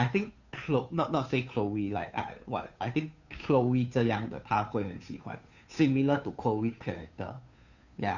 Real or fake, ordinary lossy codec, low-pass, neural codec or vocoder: fake; none; 7.2 kHz; codec, 16 kHz, 2 kbps, FunCodec, trained on Chinese and English, 25 frames a second